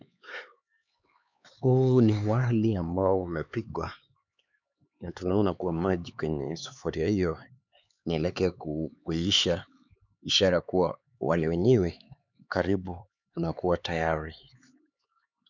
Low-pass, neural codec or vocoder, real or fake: 7.2 kHz; codec, 16 kHz, 4 kbps, X-Codec, HuBERT features, trained on LibriSpeech; fake